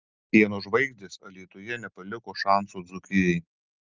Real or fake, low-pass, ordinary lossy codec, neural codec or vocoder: real; 7.2 kHz; Opus, 32 kbps; none